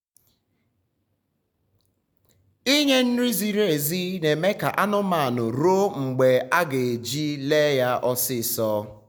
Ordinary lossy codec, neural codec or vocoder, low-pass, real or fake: none; none; none; real